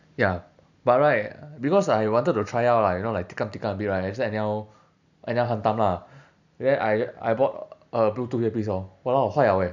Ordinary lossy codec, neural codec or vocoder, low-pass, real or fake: none; none; 7.2 kHz; real